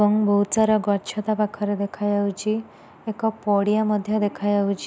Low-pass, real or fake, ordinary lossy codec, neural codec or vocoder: none; real; none; none